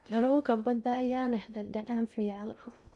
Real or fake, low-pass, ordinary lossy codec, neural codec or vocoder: fake; 10.8 kHz; none; codec, 16 kHz in and 24 kHz out, 0.6 kbps, FocalCodec, streaming, 2048 codes